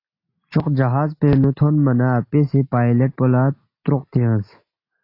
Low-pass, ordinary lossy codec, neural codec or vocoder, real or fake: 5.4 kHz; AAC, 32 kbps; none; real